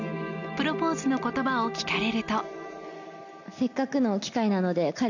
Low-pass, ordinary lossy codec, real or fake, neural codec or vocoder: 7.2 kHz; none; real; none